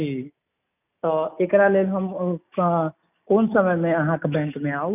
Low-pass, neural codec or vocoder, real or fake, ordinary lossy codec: 3.6 kHz; none; real; none